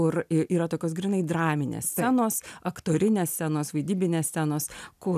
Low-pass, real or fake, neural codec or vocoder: 14.4 kHz; real; none